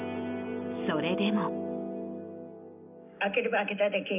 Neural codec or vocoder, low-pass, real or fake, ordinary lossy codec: none; 3.6 kHz; real; none